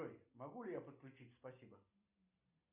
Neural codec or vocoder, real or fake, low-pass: none; real; 3.6 kHz